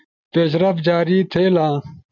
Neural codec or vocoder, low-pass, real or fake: none; 7.2 kHz; real